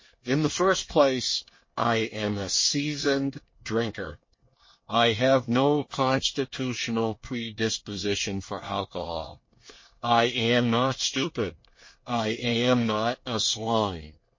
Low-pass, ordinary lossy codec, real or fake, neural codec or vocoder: 7.2 kHz; MP3, 32 kbps; fake; codec, 24 kHz, 1 kbps, SNAC